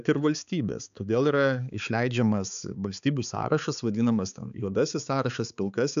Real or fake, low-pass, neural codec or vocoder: fake; 7.2 kHz; codec, 16 kHz, 4 kbps, X-Codec, HuBERT features, trained on balanced general audio